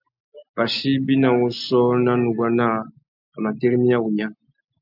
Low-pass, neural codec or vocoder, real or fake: 5.4 kHz; none; real